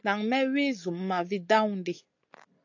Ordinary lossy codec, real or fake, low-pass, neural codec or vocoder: AAC, 48 kbps; real; 7.2 kHz; none